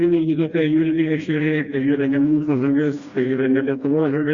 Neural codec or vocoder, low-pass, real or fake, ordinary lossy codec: codec, 16 kHz, 1 kbps, FreqCodec, smaller model; 7.2 kHz; fake; Opus, 64 kbps